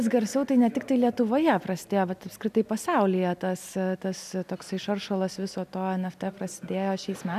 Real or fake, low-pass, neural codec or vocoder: real; 14.4 kHz; none